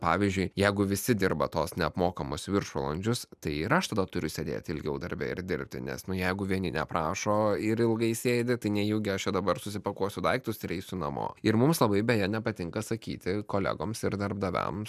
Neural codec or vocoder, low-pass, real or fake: none; 14.4 kHz; real